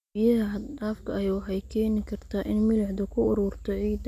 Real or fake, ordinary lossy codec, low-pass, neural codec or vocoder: real; none; 14.4 kHz; none